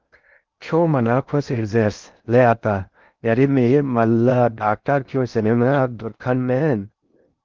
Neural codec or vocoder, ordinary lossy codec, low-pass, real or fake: codec, 16 kHz in and 24 kHz out, 0.6 kbps, FocalCodec, streaming, 2048 codes; Opus, 24 kbps; 7.2 kHz; fake